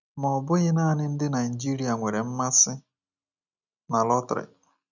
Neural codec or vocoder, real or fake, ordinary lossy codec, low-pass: none; real; none; 7.2 kHz